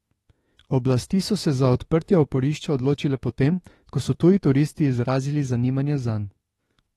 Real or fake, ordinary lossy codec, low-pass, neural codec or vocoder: fake; AAC, 32 kbps; 19.8 kHz; autoencoder, 48 kHz, 32 numbers a frame, DAC-VAE, trained on Japanese speech